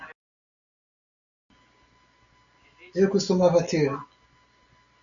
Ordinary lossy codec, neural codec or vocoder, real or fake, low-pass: MP3, 96 kbps; none; real; 7.2 kHz